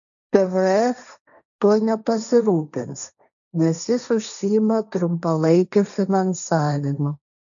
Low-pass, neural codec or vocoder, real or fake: 7.2 kHz; codec, 16 kHz, 1.1 kbps, Voila-Tokenizer; fake